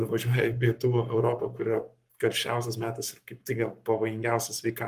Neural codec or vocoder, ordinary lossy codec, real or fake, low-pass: codec, 44.1 kHz, 7.8 kbps, Pupu-Codec; Opus, 32 kbps; fake; 14.4 kHz